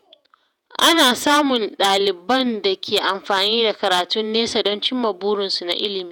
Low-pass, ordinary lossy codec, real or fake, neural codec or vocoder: none; none; fake; vocoder, 48 kHz, 128 mel bands, Vocos